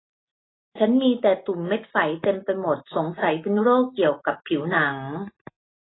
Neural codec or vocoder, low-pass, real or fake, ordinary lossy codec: none; 7.2 kHz; real; AAC, 16 kbps